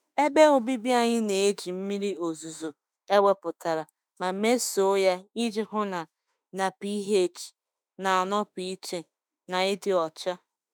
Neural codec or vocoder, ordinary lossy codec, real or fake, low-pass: autoencoder, 48 kHz, 32 numbers a frame, DAC-VAE, trained on Japanese speech; none; fake; none